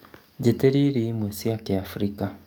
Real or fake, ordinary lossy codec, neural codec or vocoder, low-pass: fake; none; codec, 44.1 kHz, 7.8 kbps, DAC; 19.8 kHz